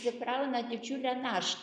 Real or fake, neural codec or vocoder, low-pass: real; none; 9.9 kHz